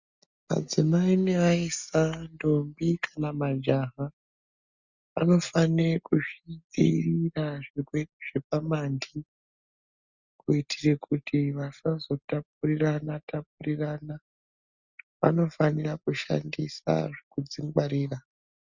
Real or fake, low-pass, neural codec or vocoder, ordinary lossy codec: real; 7.2 kHz; none; Opus, 64 kbps